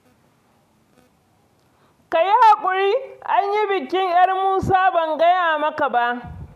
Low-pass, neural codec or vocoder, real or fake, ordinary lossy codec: 14.4 kHz; none; real; AAC, 96 kbps